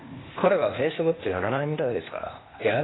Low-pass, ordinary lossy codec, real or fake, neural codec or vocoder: 7.2 kHz; AAC, 16 kbps; fake; codec, 16 kHz, 0.8 kbps, ZipCodec